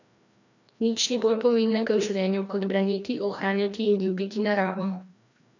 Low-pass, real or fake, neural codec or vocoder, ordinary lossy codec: 7.2 kHz; fake; codec, 16 kHz, 1 kbps, FreqCodec, larger model; none